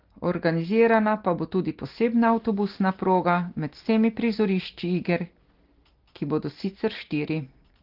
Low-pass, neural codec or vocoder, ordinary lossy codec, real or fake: 5.4 kHz; none; Opus, 16 kbps; real